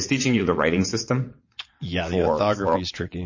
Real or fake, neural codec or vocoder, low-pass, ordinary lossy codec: fake; vocoder, 22.05 kHz, 80 mel bands, WaveNeXt; 7.2 kHz; MP3, 32 kbps